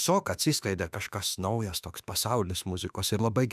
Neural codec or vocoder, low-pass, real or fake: autoencoder, 48 kHz, 32 numbers a frame, DAC-VAE, trained on Japanese speech; 14.4 kHz; fake